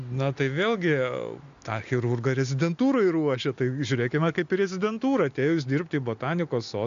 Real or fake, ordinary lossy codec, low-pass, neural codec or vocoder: real; MP3, 64 kbps; 7.2 kHz; none